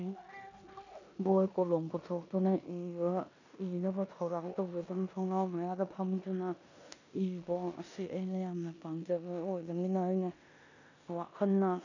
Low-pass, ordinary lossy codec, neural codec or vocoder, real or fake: 7.2 kHz; none; codec, 16 kHz in and 24 kHz out, 0.9 kbps, LongCat-Audio-Codec, four codebook decoder; fake